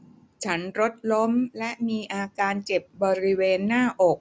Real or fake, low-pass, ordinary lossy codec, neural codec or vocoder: real; none; none; none